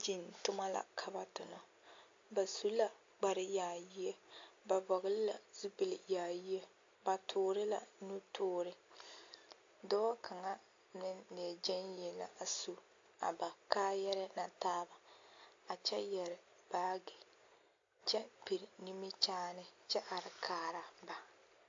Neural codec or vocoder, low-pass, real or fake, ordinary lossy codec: none; 7.2 kHz; real; AAC, 48 kbps